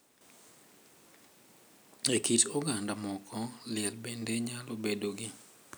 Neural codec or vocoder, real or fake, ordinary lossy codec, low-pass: none; real; none; none